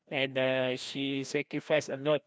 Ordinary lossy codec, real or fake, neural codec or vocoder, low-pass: none; fake; codec, 16 kHz, 1 kbps, FreqCodec, larger model; none